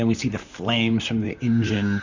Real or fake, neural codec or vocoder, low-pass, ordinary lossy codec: fake; vocoder, 44.1 kHz, 128 mel bands, Pupu-Vocoder; 7.2 kHz; Opus, 64 kbps